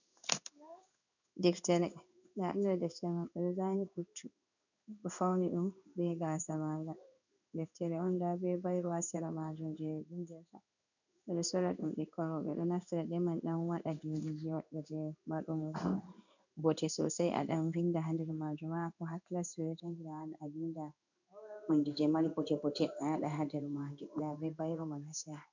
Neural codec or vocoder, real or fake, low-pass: codec, 16 kHz in and 24 kHz out, 1 kbps, XY-Tokenizer; fake; 7.2 kHz